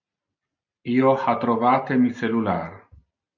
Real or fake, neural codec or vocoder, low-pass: real; none; 7.2 kHz